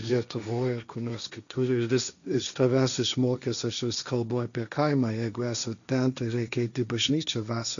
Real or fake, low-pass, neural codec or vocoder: fake; 7.2 kHz; codec, 16 kHz, 1.1 kbps, Voila-Tokenizer